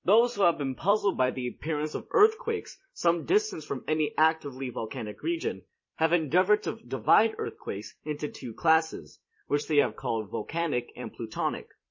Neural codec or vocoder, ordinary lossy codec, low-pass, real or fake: vocoder, 44.1 kHz, 128 mel bands every 256 samples, BigVGAN v2; MP3, 32 kbps; 7.2 kHz; fake